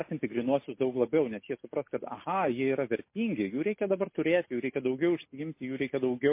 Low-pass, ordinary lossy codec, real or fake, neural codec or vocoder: 3.6 kHz; MP3, 24 kbps; real; none